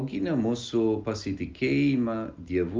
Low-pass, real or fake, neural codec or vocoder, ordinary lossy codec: 7.2 kHz; real; none; Opus, 32 kbps